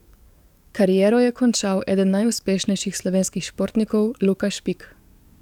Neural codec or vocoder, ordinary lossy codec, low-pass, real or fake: codec, 44.1 kHz, 7.8 kbps, DAC; none; 19.8 kHz; fake